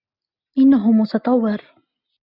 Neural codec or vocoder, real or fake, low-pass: none; real; 5.4 kHz